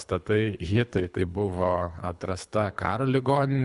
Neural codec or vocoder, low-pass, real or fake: codec, 24 kHz, 3 kbps, HILCodec; 10.8 kHz; fake